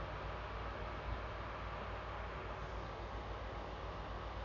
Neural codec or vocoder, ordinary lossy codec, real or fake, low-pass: none; none; real; 7.2 kHz